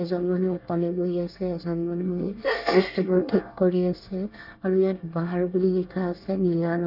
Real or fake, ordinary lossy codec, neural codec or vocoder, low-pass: fake; none; codec, 24 kHz, 1 kbps, SNAC; 5.4 kHz